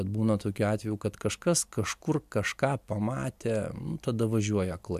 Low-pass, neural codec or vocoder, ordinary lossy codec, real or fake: 14.4 kHz; none; MP3, 96 kbps; real